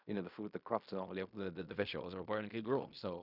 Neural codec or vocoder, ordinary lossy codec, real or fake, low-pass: codec, 16 kHz in and 24 kHz out, 0.4 kbps, LongCat-Audio-Codec, fine tuned four codebook decoder; none; fake; 5.4 kHz